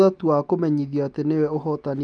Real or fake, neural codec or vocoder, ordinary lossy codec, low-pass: real; none; Opus, 32 kbps; 9.9 kHz